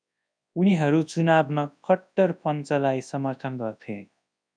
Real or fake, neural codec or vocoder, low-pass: fake; codec, 24 kHz, 0.9 kbps, WavTokenizer, large speech release; 9.9 kHz